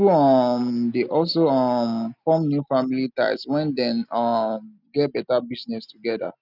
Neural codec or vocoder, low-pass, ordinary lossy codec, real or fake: none; 5.4 kHz; none; real